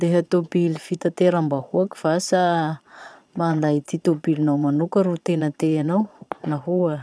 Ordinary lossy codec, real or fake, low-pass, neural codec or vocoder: none; fake; none; vocoder, 22.05 kHz, 80 mel bands, Vocos